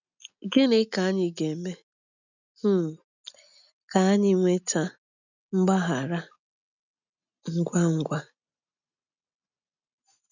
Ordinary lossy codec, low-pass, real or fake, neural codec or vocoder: none; 7.2 kHz; real; none